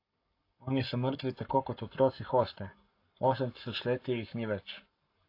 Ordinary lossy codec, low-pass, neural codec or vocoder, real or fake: AAC, 32 kbps; 5.4 kHz; codec, 44.1 kHz, 7.8 kbps, Pupu-Codec; fake